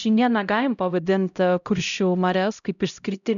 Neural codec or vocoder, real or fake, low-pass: codec, 16 kHz, 0.5 kbps, X-Codec, HuBERT features, trained on LibriSpeech; fake; 7.2 kHz